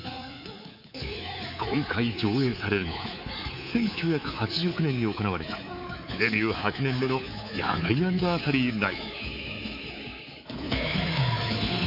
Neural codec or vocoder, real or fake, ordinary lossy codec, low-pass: codec, 24 kHz, 3.1 kbps, DualCodec; fake; none; 5.4 kHz